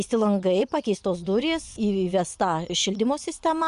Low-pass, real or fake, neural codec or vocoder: 10.8 kHz; fake; vocoder, 24 kHz, 100 mel bands, Vocos